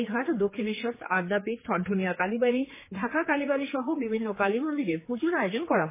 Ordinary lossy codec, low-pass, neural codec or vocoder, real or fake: MP3, 16 kbps; 3.6 kHz; codec, 16 kHz, 4 kbps, X-Codec, HuBERT features, trained on general audio; fake